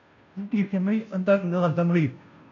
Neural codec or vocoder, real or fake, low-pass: codec, 16 kHz, 0.5 kbps, FunCodec, trained on Chinese and English, 25 frames a second; fake; 7.2 kHz